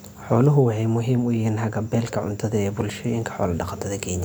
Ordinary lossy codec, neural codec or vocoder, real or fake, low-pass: none; none; real; none